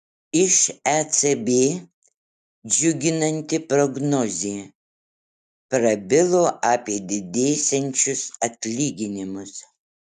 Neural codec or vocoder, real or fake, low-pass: none; real; 14.4 kHz